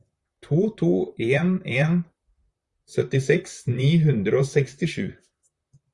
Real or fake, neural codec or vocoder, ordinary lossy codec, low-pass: fake; vocoder, 44.1 kHz, 128 mel bands, Pupu-Vocoder; Opus, 64 kbps; 10.8 kHz